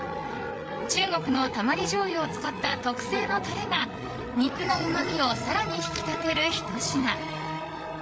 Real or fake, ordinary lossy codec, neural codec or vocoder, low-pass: fake; none; codec, 16 kHz, 8 kbps, FreqCodec, larger model; none